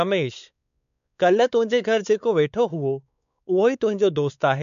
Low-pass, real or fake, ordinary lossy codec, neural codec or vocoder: 7.2 kHz; fake; none; codec, 16 kHz, 4 kbps, X-Codec, WavLM features, trained on Multilingual LibriSpeech